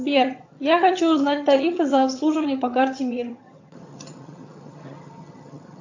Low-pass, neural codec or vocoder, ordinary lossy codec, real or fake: 7.2 kHz; vocoder, 22.05 kHz, 80 mel bands, HiFi-GAN; AAC, 48 kbps; fake